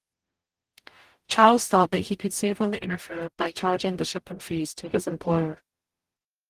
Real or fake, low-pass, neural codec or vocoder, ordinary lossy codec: fake; 14.4 kHz; codec, 44.1 kHz, 0.9 kbps, DAC; Opus, 24 kbps